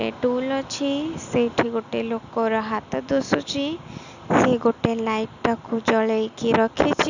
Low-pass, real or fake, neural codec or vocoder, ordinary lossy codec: 7.2 kHz; real; none; none